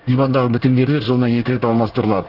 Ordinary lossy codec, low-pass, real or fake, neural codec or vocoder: Opus, 16 kbps; 5.4 kHz; fake; codec, 24 kHz, 1 kbps, SNAC